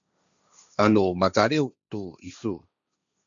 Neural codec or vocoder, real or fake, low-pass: codec, 16 kHz, 1.1 kbps, Voila-Tokenizer; fake; 7.2 kHz